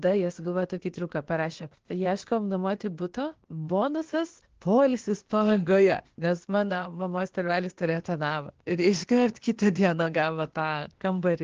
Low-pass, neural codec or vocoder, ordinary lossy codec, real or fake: 7.2 kHz; codec, 16 kHz, 0.8 kbps, ZipCodec; Opus, 16 kbps; fake